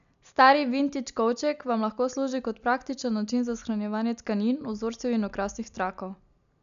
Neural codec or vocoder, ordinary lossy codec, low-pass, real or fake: none; none; 7.2 kHz; real